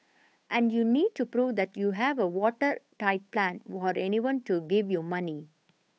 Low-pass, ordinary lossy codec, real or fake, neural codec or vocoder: none; none; fake; codec, 16 kHz, 8 kbps, FunCodec, trained on Chinese and English, 25 frames a second